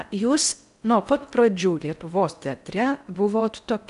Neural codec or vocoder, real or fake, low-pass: codec, 16 kHz in and 24 kHz out, 0.6 kbps, FocalCodec, streaming, 4096 codes; fake; 10.8 kHz